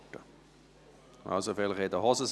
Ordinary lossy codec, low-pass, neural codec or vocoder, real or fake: none; none; none; real